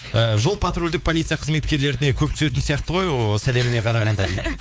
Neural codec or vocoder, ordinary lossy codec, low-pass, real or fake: codec, 16 kHz, 4 kbps, X-Codec, WavLM features, trained on Multilingual LibriSpeech; none; none; fake